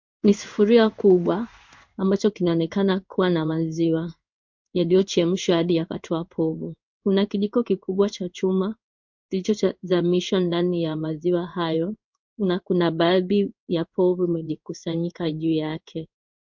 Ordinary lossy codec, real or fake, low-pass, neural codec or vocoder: MP3, 48 kbps; fake; 7.2 kHz; codec, 16 kHz in and 24 kHz out, 1 kbps, XY-Tokenizer